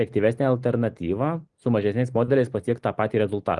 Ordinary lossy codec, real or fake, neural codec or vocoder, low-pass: Opus, 32 kbps; real; none; 10.8 kHz